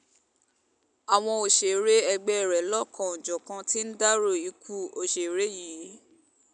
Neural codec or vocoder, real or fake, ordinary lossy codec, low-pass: none; real; none; 9.9 kHz